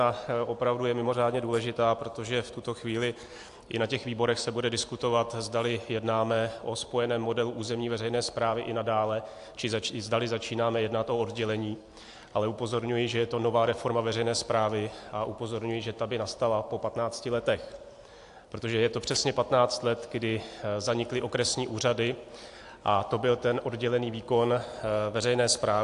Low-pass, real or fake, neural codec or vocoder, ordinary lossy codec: 10.8 kHz; real; none; AAC, 64 kbps